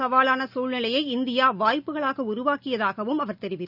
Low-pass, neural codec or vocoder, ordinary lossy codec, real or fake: 5.4 kHz; none; none; real